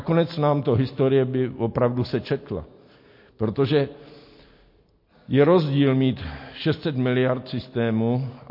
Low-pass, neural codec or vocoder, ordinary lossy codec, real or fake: 5.4 kHz; none; MP3, 32 kbps; real